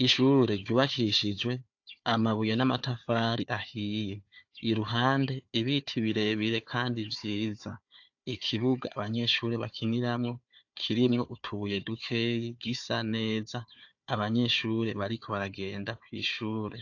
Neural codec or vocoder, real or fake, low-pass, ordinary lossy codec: codec, 16 kHz, 4 kbps, FunCodec, trained on Chinese and English, 50 frames a second; fake; 7.2 kHz; AAC, 48 kbps